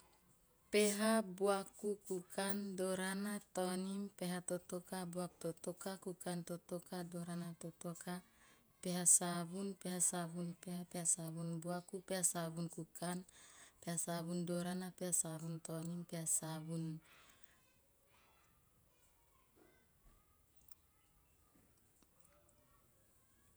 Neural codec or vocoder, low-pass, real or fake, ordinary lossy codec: vocoder, 48 kHz, 128 mel bands, Vocos; none; fake; none